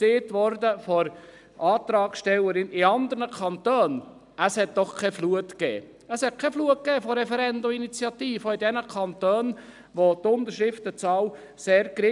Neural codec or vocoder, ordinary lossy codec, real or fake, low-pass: none; none; real; 10.8 kHz